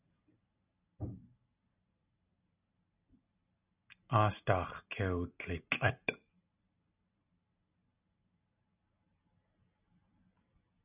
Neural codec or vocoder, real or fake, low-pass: none; real; 3.6 kHz